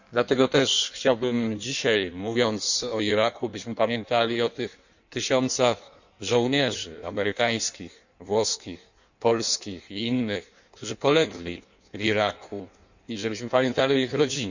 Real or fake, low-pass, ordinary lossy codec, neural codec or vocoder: fake; 7.2 kHz; none; codec, 16 kHz in and 24 kHz out, 1.1 kbps, FireRedTTS-2 codec